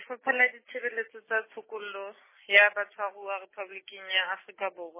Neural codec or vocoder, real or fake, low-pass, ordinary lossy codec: none; real; 3.6 kHz; MP3, 16 kbps